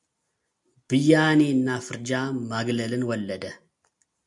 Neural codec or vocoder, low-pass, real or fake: none; 10.8 kHz; real